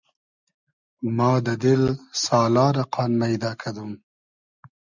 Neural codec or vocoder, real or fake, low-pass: none; real; 7.2 kHz